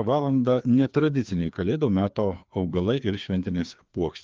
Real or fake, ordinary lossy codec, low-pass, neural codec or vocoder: fake; Opus, 24 kbps; 7.2 kHz; codec, 16 kHz, 2 kbps, FreqCodec, larger model